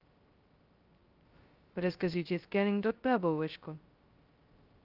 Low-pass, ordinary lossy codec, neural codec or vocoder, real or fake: 5.4 kHz; Opus, 24 kbps; codec, 16 kHz, 0.2 kbps, FocalCodec; fake